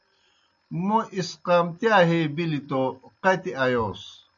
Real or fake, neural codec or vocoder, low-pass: real; none; 7.2 kHz